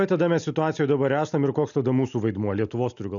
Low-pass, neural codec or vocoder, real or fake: 7.2 kHz; none; real